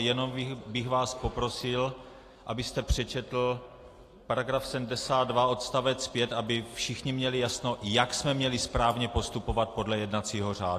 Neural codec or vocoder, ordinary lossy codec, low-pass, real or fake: none; AAC, 48 kbps; 14.4 kHz; real